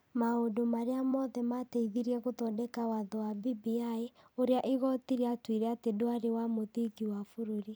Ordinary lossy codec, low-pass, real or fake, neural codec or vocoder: none; none; fake; vocoder, 44.1 kHz, 128 mel bands every 256 samples, BigVGAN v2